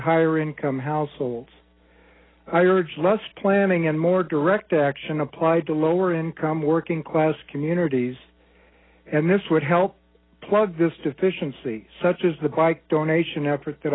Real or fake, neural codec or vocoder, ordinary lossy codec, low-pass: real; none; AAC, 16 kbps; 7.2 kHz